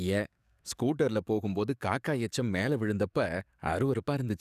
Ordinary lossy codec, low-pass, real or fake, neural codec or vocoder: none; 14.4 kHz; fake; codec, 44.1 kHz, 7.8 kbps, DAC